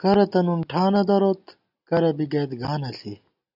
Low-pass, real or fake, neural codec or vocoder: 5.4 kHz; real; none